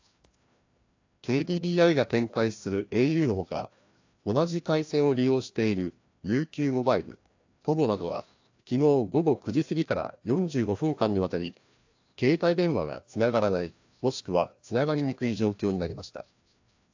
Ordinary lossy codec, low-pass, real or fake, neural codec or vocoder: AAC, 48 kbps; 7.2 kHz; fake; codec, 16 kHz, 1 kbps, FreqCodec, larger model